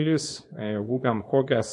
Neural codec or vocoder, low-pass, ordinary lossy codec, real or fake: codec, 24 kHz, 0.9 kbps, WavTokenizer, small release; 10.8 kHz; MP3, 64 kbps; fake